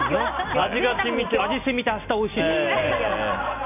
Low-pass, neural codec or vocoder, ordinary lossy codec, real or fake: 3.6 kHz; none; none; real